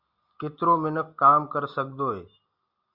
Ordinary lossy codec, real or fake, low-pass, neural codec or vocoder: Opus, 64 kbps; real; 5.4 kHz; none